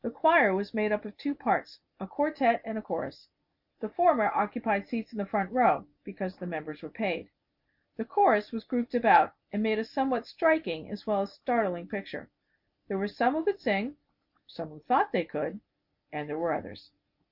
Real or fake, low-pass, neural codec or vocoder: real; 5.4 kHz; none